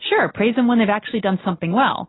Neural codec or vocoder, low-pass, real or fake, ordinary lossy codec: none; 7.2 kHz; real; AAC, 16 kbps